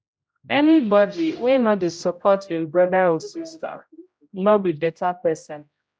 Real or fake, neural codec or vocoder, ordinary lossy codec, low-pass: fake; codec, 16 kHz, 0.5 kbps, X-Codec, HuBERT features, trained on general audio; none; none